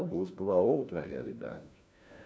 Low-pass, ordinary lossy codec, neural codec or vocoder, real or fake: none; none; codec, 16 kHz, 1 kbps, FunCodec, trained on LibriTTS, 50 frames a second; fake